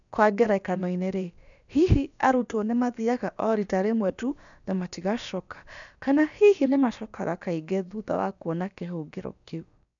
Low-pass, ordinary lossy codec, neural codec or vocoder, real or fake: 7.2 kHz; none; codec, 16 kHz, about 1 kbps, DyCAST, with the encoder's durations; fake